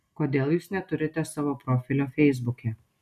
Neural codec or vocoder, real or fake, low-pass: none; real; 14.4 kHz